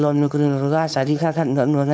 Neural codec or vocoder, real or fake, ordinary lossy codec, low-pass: codec, 16 kHz, 4.8 kbps, FACodec; fake; none; none